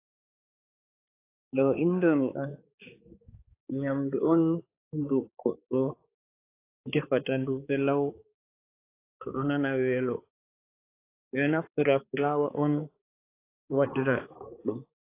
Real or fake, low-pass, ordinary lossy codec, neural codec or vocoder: fake; 3.6 kHz; AAC, 24 kbps; codec, 16 kHz, 4 kbps, X-Codec, HuBERT features, trained on balanced general audio